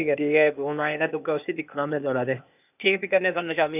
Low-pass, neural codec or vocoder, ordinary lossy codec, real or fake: 3.6 kHz; codec, 16 kHz, 0.8 kbps, ZipCodec; none; fake